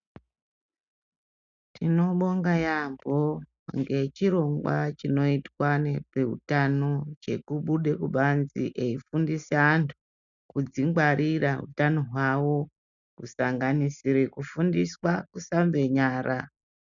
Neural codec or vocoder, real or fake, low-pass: none; real; 7.2 kHz